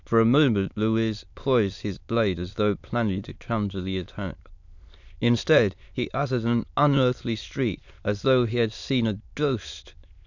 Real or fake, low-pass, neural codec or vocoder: fake; 7.2 kHz; autoencoder, 22.05 kHz, a latent of 192 numbers a frame, VITS, trained on many speakers